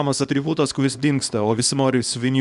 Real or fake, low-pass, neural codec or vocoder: fake; 10.8 kHz; codec, 24 kHz, 0.9 kbps, WavTokenizer, small release